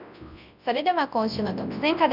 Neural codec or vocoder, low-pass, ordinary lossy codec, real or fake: codec, 24 kHz, 0.9 kbps, WavTokenizer, large speech release; 5.4 kHz; none; fake